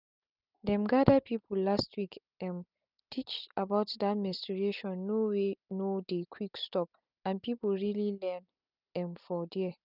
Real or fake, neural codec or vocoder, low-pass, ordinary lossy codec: real; none; 5.4 kHz; none